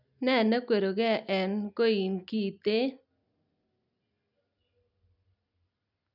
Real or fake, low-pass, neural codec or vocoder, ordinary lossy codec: real; 5.4 kHz; none; none